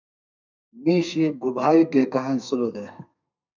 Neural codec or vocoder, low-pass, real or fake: codec, 32 kHz, 1.9 kbps, SNAC; 7.2 kHz; fake